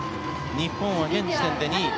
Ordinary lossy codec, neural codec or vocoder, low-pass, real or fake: none; none; none; real